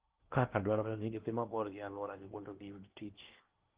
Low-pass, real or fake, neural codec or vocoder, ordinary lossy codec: 3.6 kHz; fake; codec, 16 kHz in and 24 kHz out, 0.8 kbps, FocalCodec, streaming, 65536 codes; Opus, 16 kbps